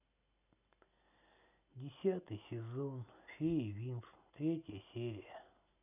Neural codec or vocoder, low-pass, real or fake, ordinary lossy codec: none; 3.6 kHz; real; none